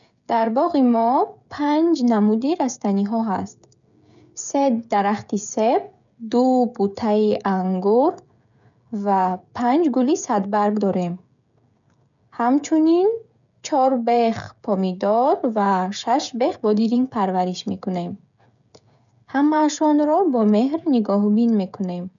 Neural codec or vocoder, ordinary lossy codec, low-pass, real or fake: codec, 16 kHz, 16 kbps, FreqCodec, smaller model; none; 7.2 kHz; fake